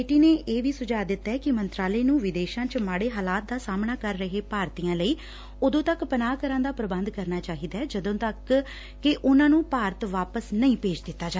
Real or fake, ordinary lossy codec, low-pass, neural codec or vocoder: real; none; none; none